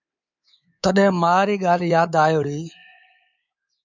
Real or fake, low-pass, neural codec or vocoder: fake; 7.2 kHz; codec, 24 kHz, 3.1 kbps, DualCodec